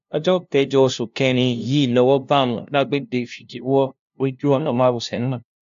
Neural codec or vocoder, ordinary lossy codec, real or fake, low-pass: codec, 16 kHz, 0.5 kbps, FunCodec, trained on LibriTTS, 25 frames a second; AAC, 64 kbps; fake; 7.2 kHz